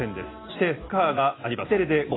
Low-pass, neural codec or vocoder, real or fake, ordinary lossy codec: 7.2 kHz; none; real; AAC, 16 kbps